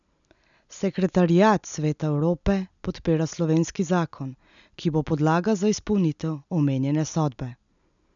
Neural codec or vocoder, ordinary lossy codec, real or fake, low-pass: none; none; real; 7.2 kHz